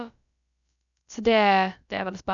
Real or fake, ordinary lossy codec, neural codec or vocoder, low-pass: fake; none; codec, 16 kHz, about 1 kbps, DyCAST, with the encoder's durations; 7.2 kHz